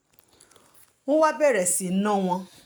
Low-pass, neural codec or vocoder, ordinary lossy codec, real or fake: none; none; none; real